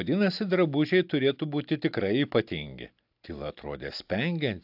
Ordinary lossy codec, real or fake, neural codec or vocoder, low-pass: AAC, 48 kbps; real; none; 5.4 kHz